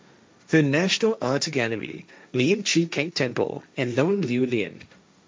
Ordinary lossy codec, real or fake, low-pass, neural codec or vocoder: none; fake; none; codec, 16 kHz, 1.1 kbps, Voila-Tokenizer